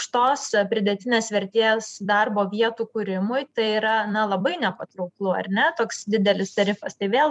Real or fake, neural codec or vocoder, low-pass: fake; vocoder, 44.1 kHz, 128 mel bands every 256 samples, BigVGAN v2; 10.8 kHz